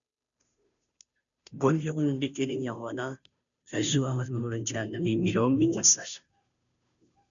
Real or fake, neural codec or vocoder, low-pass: fake; codec, 16 kHz, 0.5 kbps, FunCodec, trained on Chinese and English, 25 frames a second; 7.2 kHz